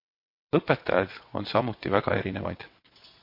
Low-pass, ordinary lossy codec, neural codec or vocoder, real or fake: 5.4 kHz; MP3, 32 kbps; vocoder, 22.05 kHz, 80 mel bands, WaveNeXt; fake